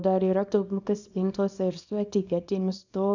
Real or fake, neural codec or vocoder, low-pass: fake; codec, 24 kHz, 0.9 kbps, WavTokenizer, small release; 7.2 kHz